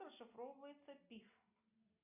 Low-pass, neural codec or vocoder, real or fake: 3.6 kHz; none; real